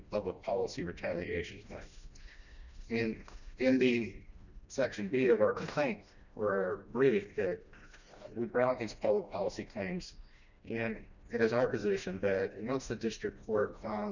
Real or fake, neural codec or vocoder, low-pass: fake; codec, 16 kHz, 1 kbps, FreqCodec, smaller model; 7.2 kHz